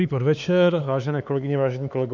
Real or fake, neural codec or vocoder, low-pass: fake; codec, 16 kHz, 4 kbps, X-Codec, HuBERT features, trained on LibriSpeech; 7.2 kHz